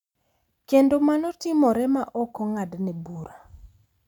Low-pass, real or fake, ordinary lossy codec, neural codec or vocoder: 19.8 kHz; real; none; none